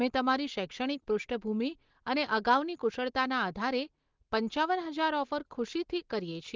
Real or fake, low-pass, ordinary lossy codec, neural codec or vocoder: real; 7.2 kHz; Opus, 16 kbps; none